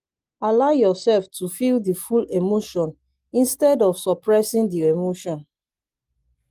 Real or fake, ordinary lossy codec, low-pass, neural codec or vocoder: real; Opus, 24 kbps; 14.4 kHz; none